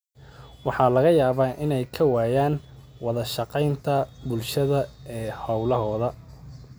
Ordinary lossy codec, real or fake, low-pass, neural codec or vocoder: none; real; none; none